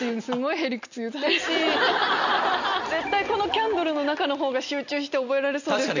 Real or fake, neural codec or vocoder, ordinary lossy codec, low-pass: real; none; none; 7.2 kHz